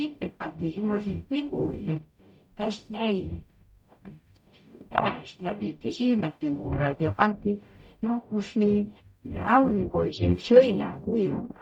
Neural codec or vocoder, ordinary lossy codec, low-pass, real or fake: codec, 44.1 kHz, 0.9 kbps, DAC; none; 19.8 kHz; fake